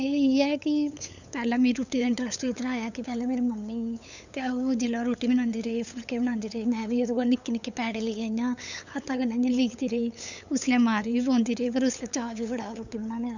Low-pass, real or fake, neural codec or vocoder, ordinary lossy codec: 7.2 kHz; fake; codec, 16 kHz, 8 kbps, FunCodec, trained on LibriTTS, 25 frames a second; none